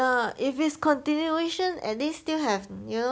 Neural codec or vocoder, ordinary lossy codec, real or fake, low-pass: none; none; real; none